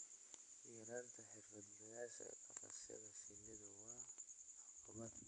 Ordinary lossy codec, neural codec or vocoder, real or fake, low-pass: AAC, 48 kbps; none; real; 9.9 kHz